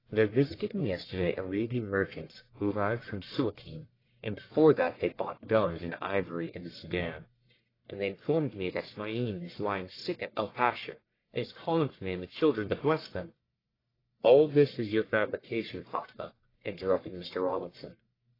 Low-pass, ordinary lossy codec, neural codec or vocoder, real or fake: 5.4 kHz; AAC, 24 kbps; codec, 44.1 kHz, 1.7 kbps, Pupu-Codec; fake